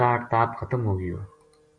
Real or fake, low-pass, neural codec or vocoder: real; 9.9 kHz; none